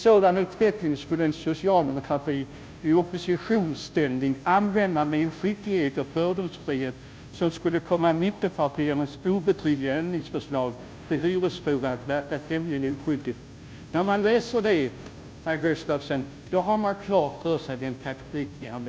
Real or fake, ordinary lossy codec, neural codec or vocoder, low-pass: fake; none; codec, 16 kHz, 0.5 kbps, FunCodec, trained on Chinese and English, 25 frames a second; none